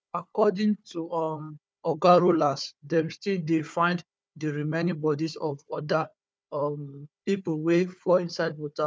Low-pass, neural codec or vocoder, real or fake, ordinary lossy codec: none; codec, 16 kHz, 4 kbps, FunCodec, trained on Chinese and English, 50 frames a second; fake; none